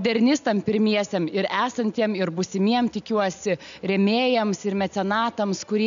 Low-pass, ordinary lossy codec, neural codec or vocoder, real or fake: 7.2 kHz; MP3, 64 kbps; none; real